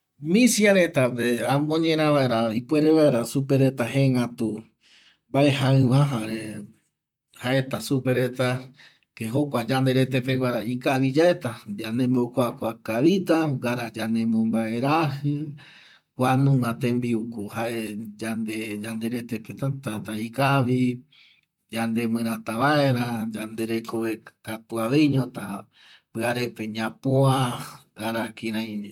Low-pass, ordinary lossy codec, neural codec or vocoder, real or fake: 19.8 kHz; MP3, 96 kbps; vocoder, 44.1 kHz, 128 mel bands, Pupu-Vocoder; fake